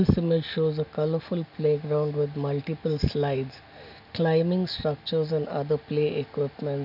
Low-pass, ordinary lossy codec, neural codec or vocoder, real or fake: 5.4 kHz; none; none; real